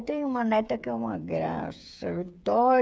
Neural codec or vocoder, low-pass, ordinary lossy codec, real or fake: codec, 16 kHz, 8 kbps, FreqCodec, smaller model; none; none; fake